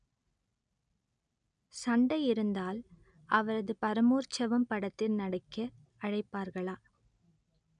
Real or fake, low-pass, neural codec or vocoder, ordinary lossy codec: real; 9.9 kHz; none; none